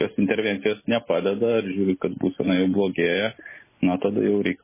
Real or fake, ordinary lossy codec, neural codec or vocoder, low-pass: real; MP3, 16 kbps; none; 3.6 kHz